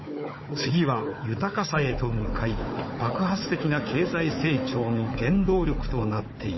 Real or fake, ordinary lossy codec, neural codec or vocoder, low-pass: fake; MP3, 24 kbps; codec, 16 kHz, 4 kbps, FunCodec, trained on Chinese and English, 50 frames a second; 7.2 kHz